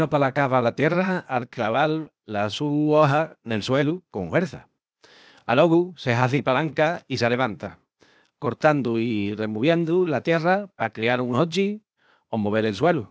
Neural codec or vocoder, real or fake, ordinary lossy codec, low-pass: codec, 16 kHz, 0.8 kbps, ZipCodec; fake; none; none